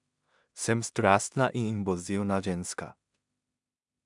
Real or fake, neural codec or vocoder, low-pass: fake; codec, 16 kHz in and 24 kHz out, 0.4 kbps, LongCat-Audio-Codec, two codebook decoder; 10.8 kHz